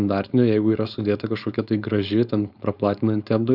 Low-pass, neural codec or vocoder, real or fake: 5.4 kHz; codec, 16 kHz, 4.8 kbps, FACodec; fake